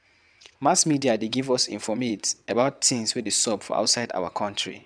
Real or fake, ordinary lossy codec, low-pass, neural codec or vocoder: fake; none; 9.9 kHz; vocoder, 22.05 kHz, 80 mel bands, WaveNeXt